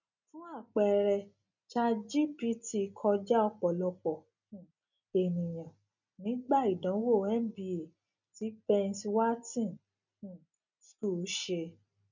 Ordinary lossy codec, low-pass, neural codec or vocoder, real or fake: none; 7.2 kHz; none; real